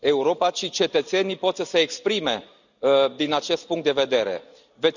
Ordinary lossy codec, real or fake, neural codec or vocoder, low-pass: none; real; none; 7.2 kHz